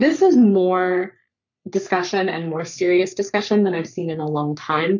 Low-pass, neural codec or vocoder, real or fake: 7.2 kHz; codec, 44.1 kHz, 3.4 kbps, Pupu-Codec; fake